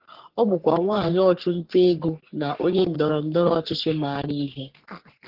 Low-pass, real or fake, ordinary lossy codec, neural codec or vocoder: 5.4 kHz; fake; Opus, 16 kbps; codec, 44.1 kHz, 3.4 kbps, Pupu-Codec